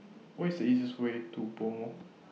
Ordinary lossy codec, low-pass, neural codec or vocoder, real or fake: none; none; none; real